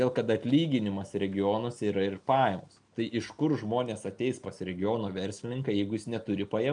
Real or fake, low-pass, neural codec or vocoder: real; 9.9 kHz; none